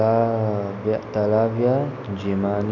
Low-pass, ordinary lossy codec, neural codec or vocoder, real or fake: 7.2 kHz; none; none; real